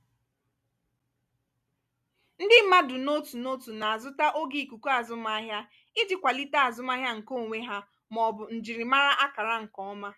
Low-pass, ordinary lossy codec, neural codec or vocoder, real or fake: 14.4 kHz; none; none; real